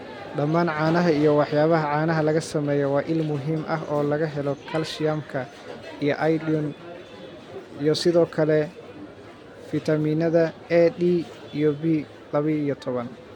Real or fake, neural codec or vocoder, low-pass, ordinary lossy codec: real; none; 19.8 kHz; MP3, 96 kbps